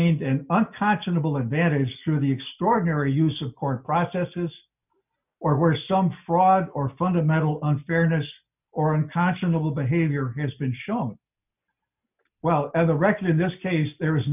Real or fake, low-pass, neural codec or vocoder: real; 3.6 kHz; none